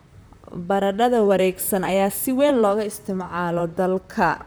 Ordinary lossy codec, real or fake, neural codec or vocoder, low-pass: none; fake; vocoder, 44.1 kHz, 128 mel bands, Pupu-Vocoder; none